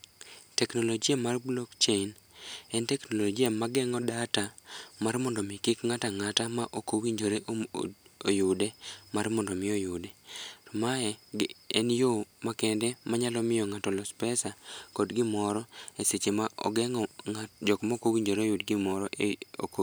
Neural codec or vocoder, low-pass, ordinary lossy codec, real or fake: none; none; none; real